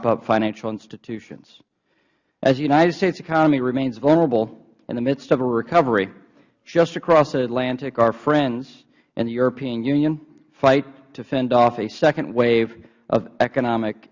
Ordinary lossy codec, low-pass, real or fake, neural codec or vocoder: Opus, 64 kbps; 7.2 kHz; real; none